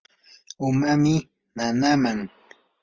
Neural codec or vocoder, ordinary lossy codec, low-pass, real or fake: none; Opus, 24 kbps; 7.2 kHz; real